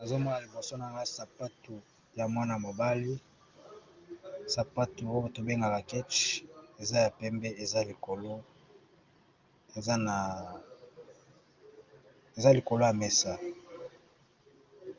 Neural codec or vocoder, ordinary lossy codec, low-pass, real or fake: none; Opus, 24 kbps; 7.2 kHz; real